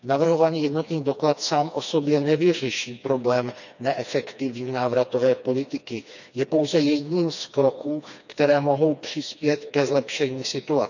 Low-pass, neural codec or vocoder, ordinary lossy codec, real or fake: 7.2 kHz; codec, 16 kHz, 2 kbps, FreqCodec, smaller model; none; fake